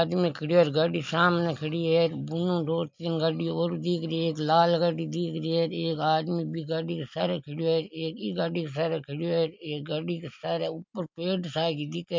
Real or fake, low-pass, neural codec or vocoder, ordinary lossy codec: real; 7.2 kHz; none; MP3, 48 kbps